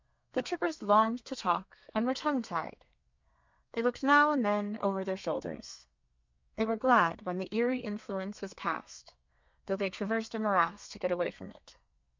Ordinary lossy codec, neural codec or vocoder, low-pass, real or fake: MP3, 64 kbps; codec, 32 kHz, 1.9 kbps, SNAC; 7.2 kHz; fake